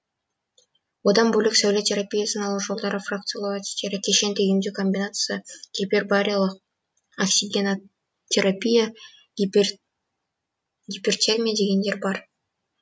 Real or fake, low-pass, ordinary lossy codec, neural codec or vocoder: real; none; none; none